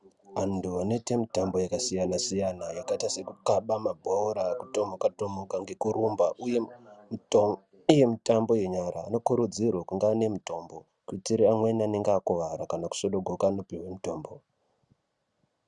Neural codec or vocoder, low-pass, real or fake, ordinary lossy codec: none; 10.8 kHz; real; Opus, 64 kbps